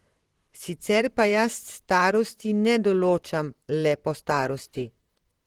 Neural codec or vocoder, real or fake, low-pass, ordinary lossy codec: vocoder, 44.1 kHz, 128 mel bands, Pupu-Vocoder; fake; 19.8 kHz; Opus, 16 kbps